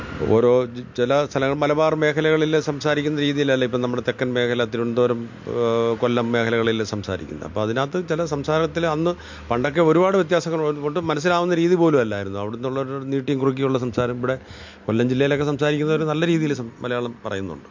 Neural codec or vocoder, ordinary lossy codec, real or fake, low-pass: none; MP3, 48 kbps; real; 7.2 kHz